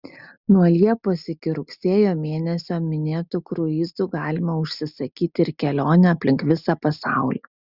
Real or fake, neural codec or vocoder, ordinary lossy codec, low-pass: real; none; Opus, 64 kbps; 5.4 kHz